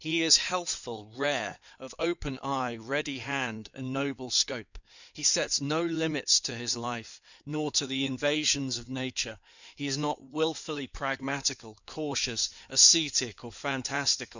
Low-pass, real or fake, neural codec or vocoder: 7.2 kHz; fake; codec, 16 kHz in and 24 kHz out, 2.2 kbps, FireRedTTS-2 codec